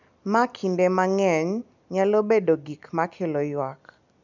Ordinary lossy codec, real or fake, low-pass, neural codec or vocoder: none; real; 7.2 kHz; none